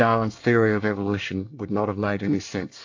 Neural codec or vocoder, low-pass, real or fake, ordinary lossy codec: codec, 24 kHz, 1 kbps, SNAC; 7.2 kHz; fake; AAC, 48 kbps